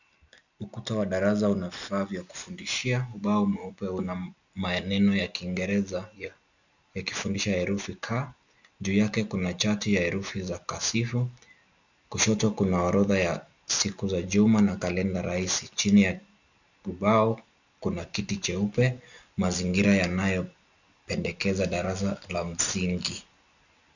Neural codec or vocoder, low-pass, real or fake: none; 7.2 kHz; real